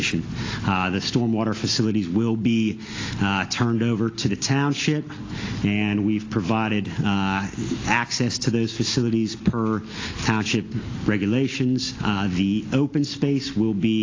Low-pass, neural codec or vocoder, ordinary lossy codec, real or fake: 7.2 kHz; none; AAC, 32 kbps; real